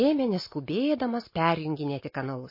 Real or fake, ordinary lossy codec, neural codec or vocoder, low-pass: real; MP3, 24 kbps; none; 5.4 kHz